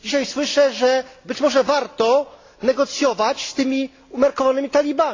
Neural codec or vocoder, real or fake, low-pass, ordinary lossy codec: none; real; 7.2 kHz; AAC, 32 kbps